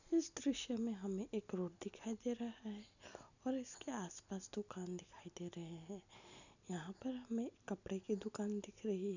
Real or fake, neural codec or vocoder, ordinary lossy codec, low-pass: real; none; none; 7.2 kHz